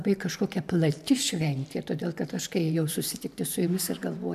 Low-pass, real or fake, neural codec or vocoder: 14.4 kHz; fake; vocoder, 44.1 kHz, 128 mel bands every 512 samples, BigVGAN v2